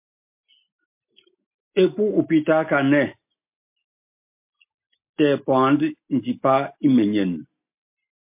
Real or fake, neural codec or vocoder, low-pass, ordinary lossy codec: real; none; 3.6 kHz; MP3, 32 kbps